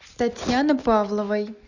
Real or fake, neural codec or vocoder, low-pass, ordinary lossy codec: real; none; 7.2 kHz; Opus, 64 kbps